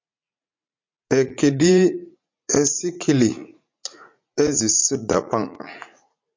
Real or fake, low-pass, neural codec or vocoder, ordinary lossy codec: fake; 7.2 kHz; vocoder, 44.1 kHz, 80 mel bands, Vocos; MP3, 48 kbps